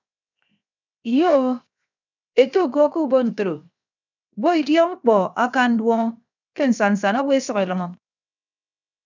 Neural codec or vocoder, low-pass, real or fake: codec, 16 kHz, 0.7 kbps, FocalCodec; 7.2 kHz; fake